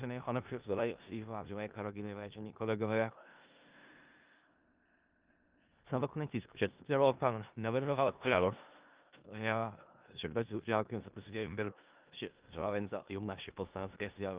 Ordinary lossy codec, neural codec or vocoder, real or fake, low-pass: Opus, 24 kbps; codec, 16 kHz in and 24 kHz out, 0.4 kbps, LongCat-Audio-Codec, four codebook decoder; fake; 3.6 kHz